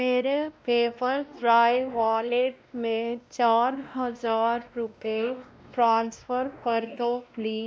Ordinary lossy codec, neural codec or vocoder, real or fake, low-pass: none; codec, 16 kHz, 1 kbps, X-Codec, WavLM features, trained on Multilingual LibriSpeech; fake; none